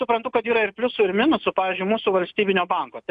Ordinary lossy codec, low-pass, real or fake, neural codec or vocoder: MP3, 96 kbps; 10.8 kHz; real; none